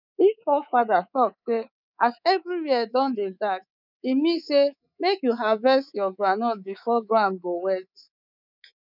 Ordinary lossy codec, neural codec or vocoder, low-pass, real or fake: none; codec, 24 kHz, 3.1 kbps, DualCodec; 5.4 kHz; fake